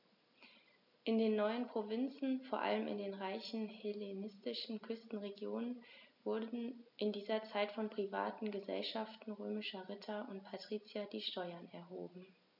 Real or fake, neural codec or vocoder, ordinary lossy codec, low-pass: real; none; none; 5.4 kHz